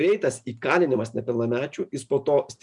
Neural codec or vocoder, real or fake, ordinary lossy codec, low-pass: none; real; MP3, 96 kbps; 10.8 kHz